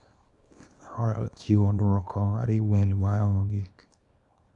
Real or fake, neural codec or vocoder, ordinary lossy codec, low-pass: fake; codec, 24 kHz, 0.9 kbps, WavTokenizer, small release; none; 10.8 kHz